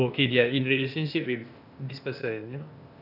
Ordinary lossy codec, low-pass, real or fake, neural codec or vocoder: none; 5.4 kHz; fake; codec, 16 kHz, 0.8 kbps, ZipCodec